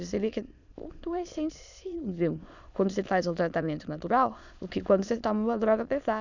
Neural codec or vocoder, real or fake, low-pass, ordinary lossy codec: autoencoder, 22.05 kHz, a latent of 192 numbers a frame, VITS, trained on many speakers; fake; 7.2 kHz; none